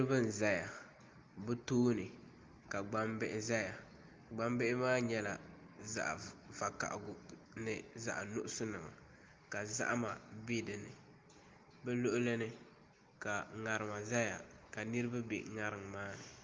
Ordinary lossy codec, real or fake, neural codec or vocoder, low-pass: Opus, 32 kbps; real; none; 7.2 kHz